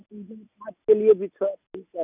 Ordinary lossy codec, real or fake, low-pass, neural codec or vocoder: none; real; 3.6 kHz; none